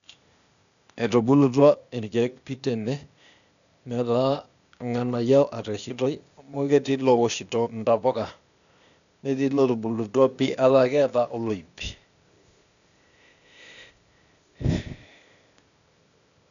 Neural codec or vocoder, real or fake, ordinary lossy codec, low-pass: codec, 16 kHz, 0.8 kbps, ZipCodec; fake; none; 7.2 kHz